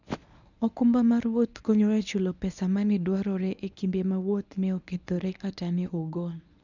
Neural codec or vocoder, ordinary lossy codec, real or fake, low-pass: codec, 24 kHz, 0.9 kbps, WavTokenizer, medium speech release version 1; none; fake; 7.2 kHz